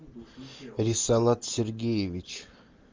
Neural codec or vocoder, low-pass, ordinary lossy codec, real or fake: none; 7.2 kHz; Opus, 32 kbps; real